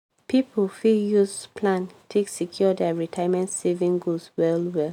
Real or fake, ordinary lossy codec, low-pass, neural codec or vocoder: real; none; 19.8 kHz; none